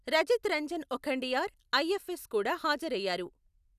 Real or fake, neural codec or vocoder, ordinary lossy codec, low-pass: real; none; none; 14.4 kHz